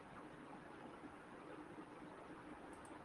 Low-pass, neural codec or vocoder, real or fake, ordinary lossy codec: 10.8 kHz; none; real; Opus, 24 kbps